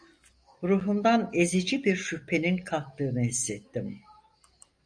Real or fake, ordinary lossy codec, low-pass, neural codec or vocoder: real; AAC, 64 kbps; 9.9 kHz; none